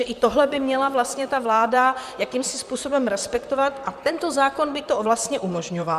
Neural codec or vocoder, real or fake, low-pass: vocoder, 44.1 kHz, 128 mel bands, Pupu-Vocoder; fake; 14.4 kHz